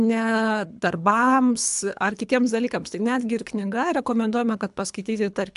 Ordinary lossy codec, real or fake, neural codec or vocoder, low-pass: AAC, 96 kbps; fake; codec, 24 kHz, 3 kbps, HILCodec; 10.8 kHz